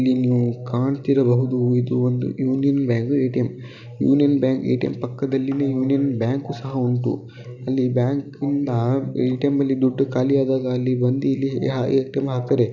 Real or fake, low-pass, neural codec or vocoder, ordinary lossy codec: real; 7.2 kHz; none; none